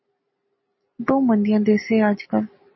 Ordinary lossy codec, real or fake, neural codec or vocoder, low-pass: MP3, 24 kbps; real; none; 7.2 kHz